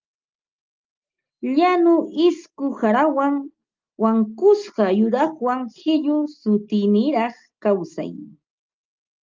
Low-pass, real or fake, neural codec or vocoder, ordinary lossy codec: 7.2 kHz; real; none; Opus, 32 kbps